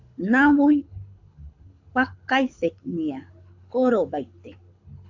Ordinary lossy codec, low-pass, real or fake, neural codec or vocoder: AAC, 48 kbps; 7.2 kHz; fake; codec, 24 kHz, 6 kbps, HILCodec